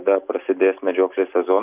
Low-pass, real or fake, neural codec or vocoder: 3.6 kHz; real; none